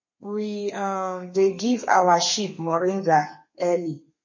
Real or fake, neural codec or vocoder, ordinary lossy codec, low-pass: fake; codec, 32 kHz, 1.9 kbps, SNAC; MP3, 32 kbps; 7.2 kHz